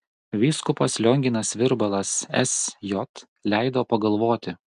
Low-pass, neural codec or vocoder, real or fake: 10.8 kHz; none; real